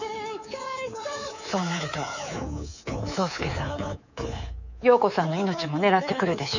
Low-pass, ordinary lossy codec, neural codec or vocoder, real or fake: 7.2 kHz; none; codec, 24 kHz, 3.1 kbps, DualCodec; fake